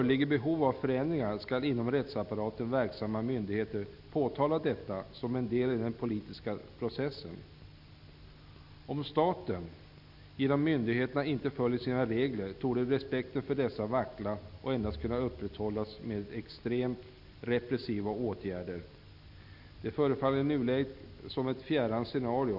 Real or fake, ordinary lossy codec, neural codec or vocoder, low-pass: real; none; none; 5.4 kHz